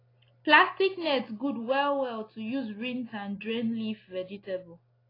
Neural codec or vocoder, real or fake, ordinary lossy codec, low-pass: none; real; AAC, 24 kbps; 5.4 kHz